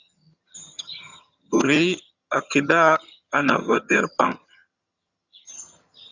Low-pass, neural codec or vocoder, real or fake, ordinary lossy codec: 7.2 kHz; vocoder, 22.05 kHz, 80 mel bands, HiFi-GAN; fake; Opus, 64 kbps